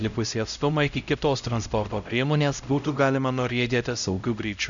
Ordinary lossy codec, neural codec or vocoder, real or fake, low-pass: MP3, 96 kbps; codec, 16 kHz, 0.5 kbps, X-Codec, HuBERT features, trained on LibriSpeech; fake; 7.2 kHz